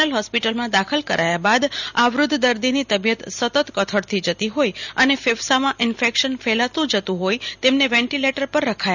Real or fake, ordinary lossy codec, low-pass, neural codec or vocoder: real; none; 7.2 kHz; none